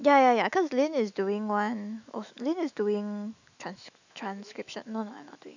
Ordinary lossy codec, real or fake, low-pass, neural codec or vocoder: none; fake; 7.2 kHz; autoencoder, 48 kHz, 128 numbers a frame, DAC-VAE, trained on Japanese speech